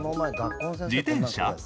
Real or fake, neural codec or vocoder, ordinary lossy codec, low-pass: real; none; none; none